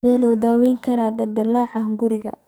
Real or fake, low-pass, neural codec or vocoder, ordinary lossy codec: fake; none; codec, 44.1 kHz, 2.6 kbps, SNAC; none